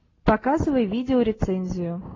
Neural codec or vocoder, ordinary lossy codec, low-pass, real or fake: none; AAC, 32 kbps; 7.2 kHz; real